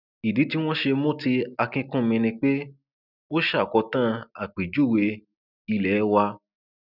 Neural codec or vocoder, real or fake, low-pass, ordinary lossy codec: none; real; 5.4 kHz; none